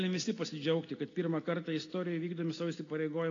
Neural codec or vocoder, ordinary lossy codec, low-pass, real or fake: none; AAC, 32 kbps; 7.2 kHz; real